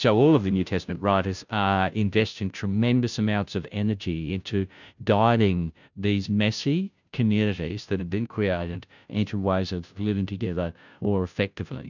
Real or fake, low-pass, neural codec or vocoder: fake; 7.2 kHz; codec, 16 kHz, 0.5 kbps, FunCodec, trained on Chinese and English, 25 frames a second